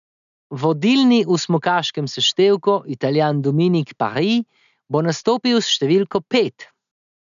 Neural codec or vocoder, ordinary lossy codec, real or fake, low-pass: none; none; real; 7.2 kHz